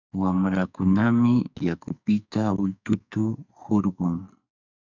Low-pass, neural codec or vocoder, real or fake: 7.2 kHz; codec, 44.1 kHz, 2.6 kbps, SNAC; fake